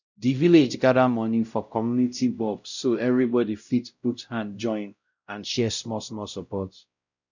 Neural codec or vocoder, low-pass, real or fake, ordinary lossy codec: codec, 16 kHz, 0.5 kbps, X-Codec, WavLM features, trained on Multilingual LibriSpeech; 7.2 kHz; fake; none